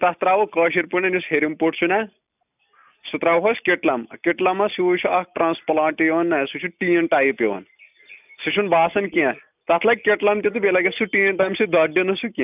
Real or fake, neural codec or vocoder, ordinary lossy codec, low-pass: real; none; none; 3.6 kHz